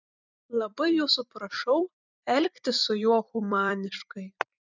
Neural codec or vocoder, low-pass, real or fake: vocoder, 44.1 kHz, 128 mel bands every 256 samples, BigVGAN v2; 7.2 kHz; fake